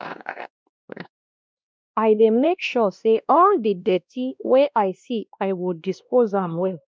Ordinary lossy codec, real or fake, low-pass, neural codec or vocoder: none; fake; none; codec, 16 kHz, 1 kbps, X-Codec, WavLM features, trained on Multilingual LibriSpeech